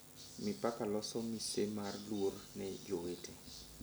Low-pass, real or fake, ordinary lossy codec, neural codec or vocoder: none; real; none; none